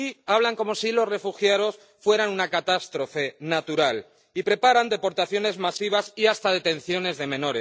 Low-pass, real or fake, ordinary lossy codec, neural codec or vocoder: none; real; none; none